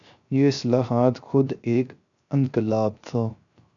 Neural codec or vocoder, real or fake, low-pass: codec, 16 kHz, 0.3 kbps, FocalCodec; fake; 7.2 kHz